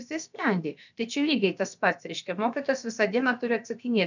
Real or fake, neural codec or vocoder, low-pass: fake; codec, 16 kHz, about 1 kbps, DyCAST, with the encoder's durations; 7.2 kHz